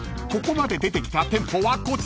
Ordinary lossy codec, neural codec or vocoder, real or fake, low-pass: none; none; real; none